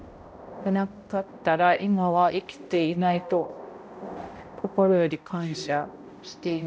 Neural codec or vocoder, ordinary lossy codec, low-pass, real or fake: codec, 16 kHz, 0.5 kbps, X-Codec, HuBERT features, trained on balanced general audio; none; none; fake